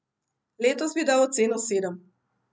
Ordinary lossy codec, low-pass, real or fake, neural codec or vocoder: none; none; real; none